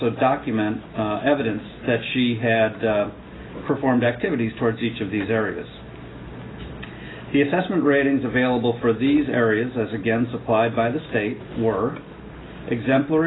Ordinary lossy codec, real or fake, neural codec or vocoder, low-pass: AAC, 16 kbps; real; none; 7.2 kHz